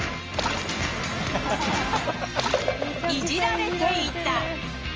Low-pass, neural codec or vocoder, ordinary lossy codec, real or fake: 7.2 kHz; none; Opus, 24 kbps; real